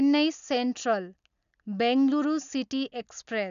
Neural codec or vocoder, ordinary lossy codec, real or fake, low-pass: none; none; real; 7.2 kHz